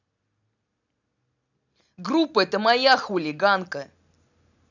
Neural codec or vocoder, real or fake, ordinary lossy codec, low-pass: none; real; none; 7.2 kHz